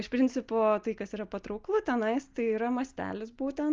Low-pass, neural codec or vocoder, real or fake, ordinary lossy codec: 7.2 kHz; none; real; Opus, 24 kbps